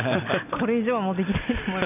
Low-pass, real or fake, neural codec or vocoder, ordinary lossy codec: 3.6 kHz; real; none; none